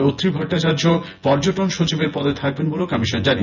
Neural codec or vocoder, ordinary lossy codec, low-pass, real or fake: vocoder, 24 kHz, 100 mel bands, Vocos; none; 7.2 kHz; fake